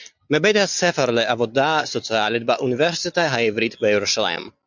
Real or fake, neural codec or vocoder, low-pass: real; none; 7.2 kHz